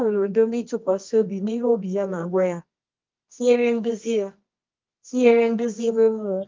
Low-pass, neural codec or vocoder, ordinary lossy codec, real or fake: 7.2 kHz; codec, 24 kHz, 0.9 kbps, WavTokenizer, medium music audio release; Opus, 32 kbps; fake